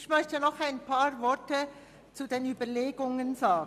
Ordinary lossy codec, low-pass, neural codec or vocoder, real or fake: none; 14.4 kHz; none; real